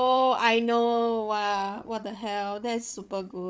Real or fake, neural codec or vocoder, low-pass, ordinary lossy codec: fake; codec, 16 kHz, 8 kbps, FreqCodec, larger model; none; none